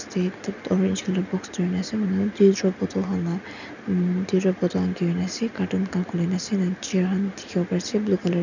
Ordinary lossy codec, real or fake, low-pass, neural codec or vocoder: none; real; 7.2 kHz; none